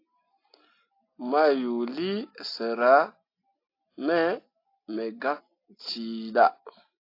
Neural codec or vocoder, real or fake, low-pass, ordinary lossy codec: none; real; 5.4 kHz; AAC, 32 kbps